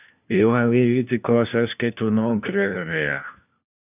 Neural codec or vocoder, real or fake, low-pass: codec, 16 kHz, 1 kbps, FunCodec, trained on LibriTTS, 50 frames a second; fake; 3.6 kHz